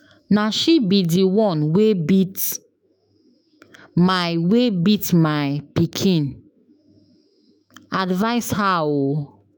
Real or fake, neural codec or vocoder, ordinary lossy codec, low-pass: fake; autoencoder, 48 kHz, 128 numbers a frame, DAC-VAE, trained on Japanese speech; none; none